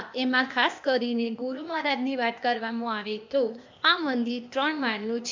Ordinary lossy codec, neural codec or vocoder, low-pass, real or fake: MP3, 64 kbps; codec, 16 kHz, 0.8 kbps, ZipCodec; 7.2 kHz; fake